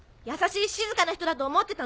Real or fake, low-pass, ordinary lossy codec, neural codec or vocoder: real; none; none; none